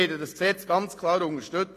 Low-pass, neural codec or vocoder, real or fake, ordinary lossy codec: 14.4 kHz; none; real; AAC, 48 kbps